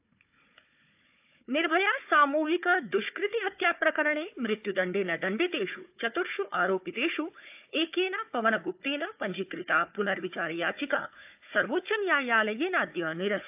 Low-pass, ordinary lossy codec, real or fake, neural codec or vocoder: 3.6 kHz; AAC, 32 kbps; fake; codec, 16 kHz, 4 kbps, FunCodec, trained on Chinese and English, 50 frames a second